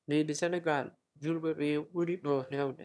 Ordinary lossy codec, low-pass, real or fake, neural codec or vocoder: none; none; fake; autoencoder, 22.05 kHz, a latent of 192 numbers a frame, VITS, trained on one speaker